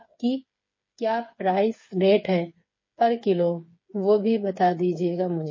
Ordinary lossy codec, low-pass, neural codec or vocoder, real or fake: MP3, 32 kbps; 7.2 kHz; codec, 16 kHz, 8 kbps, FreqCodec, smaller model; fake